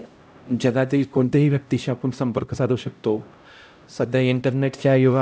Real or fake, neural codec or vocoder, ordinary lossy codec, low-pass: fake; codec, 16 kHz, 0.5 kbps, X-Codec, HuBERT features, trained on LibriSpeech; none; none